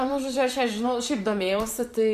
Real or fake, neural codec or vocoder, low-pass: fake; vocoder, 44.1 kHz, 128 mel bands, Pupu-Vocoder; 14.4 kHz